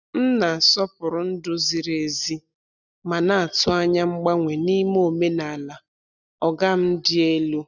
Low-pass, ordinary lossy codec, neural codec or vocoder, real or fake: 7.2 kHz; none; none; real